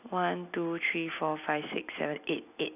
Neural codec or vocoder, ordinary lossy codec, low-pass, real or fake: none; none; 3.6 kHz; real